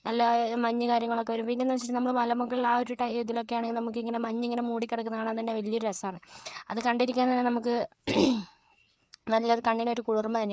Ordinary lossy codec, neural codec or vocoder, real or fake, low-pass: none; codec, 16 kHz, 4 kbps, FreqCodec, larger model; fake; none